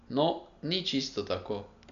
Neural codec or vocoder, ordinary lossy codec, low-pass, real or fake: none; none; 7.2 kHz; real